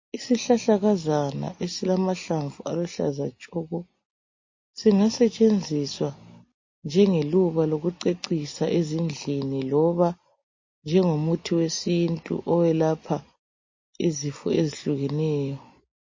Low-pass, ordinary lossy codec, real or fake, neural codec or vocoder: 7.2 kHz; MP3, 32 kbps; real; none